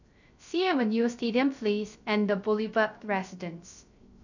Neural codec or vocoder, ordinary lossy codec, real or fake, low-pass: codec, 16 kHz, 0.3 kbps, FocalCodec; none; fake; 7.2 kHz